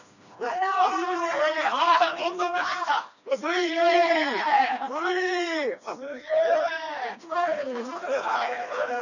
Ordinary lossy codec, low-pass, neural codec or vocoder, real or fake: none; 7.2 kHz; codec, 16 kHz, 2 kbps, FreqCodec, smaller model; fake